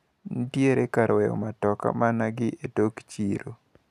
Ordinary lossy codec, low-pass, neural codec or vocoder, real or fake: none; 14.4 kHz; none; real